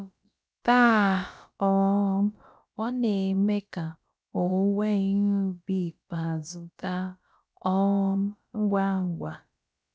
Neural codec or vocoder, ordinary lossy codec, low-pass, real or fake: codec, 16 kHz, about 1 kbps, DyCAST, with the encoder's durations; none; none; fake